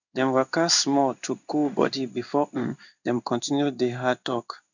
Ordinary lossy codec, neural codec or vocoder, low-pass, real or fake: none; codec, 16 kHz in and 24 kHz out, 1 kbps, XY-Tokenizer; 7.2 kHz; fake